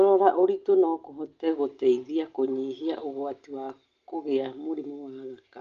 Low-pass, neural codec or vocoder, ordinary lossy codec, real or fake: 7.2 kHz; none; Opus, 32 kbps; real